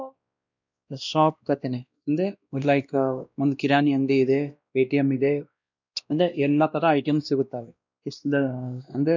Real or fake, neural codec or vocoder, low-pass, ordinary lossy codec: fake; codec, 16 kHz, 1 kbps, X-Codec, WavLM features, trained on Multilingual LibriSpeech; 7.2 kHz; none